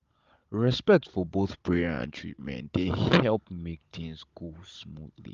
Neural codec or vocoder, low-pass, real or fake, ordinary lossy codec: codec, 16 kHz, 16 kbps, FunCodec, trained on Chinese and English, 50 frames a second; 7.2 kHz; fake; Opus, 32 kbps